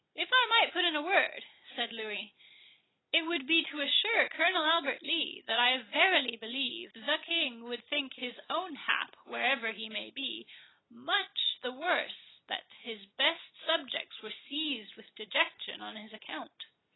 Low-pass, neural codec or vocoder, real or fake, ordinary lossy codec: 7.2 kHz; none; real; AAC, 16 kbps